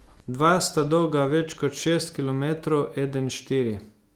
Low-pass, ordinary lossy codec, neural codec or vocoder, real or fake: 19.8 kHz; Opus, 24 kbps; autoencoder, 48 kHz, 128 numbers a frame, DAC-VAE, trained on Japanese speech; fake